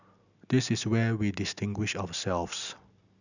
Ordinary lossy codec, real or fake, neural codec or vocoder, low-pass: none; real; none; 7.2 kHz